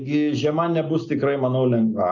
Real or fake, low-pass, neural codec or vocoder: real; 7.2 kHz; none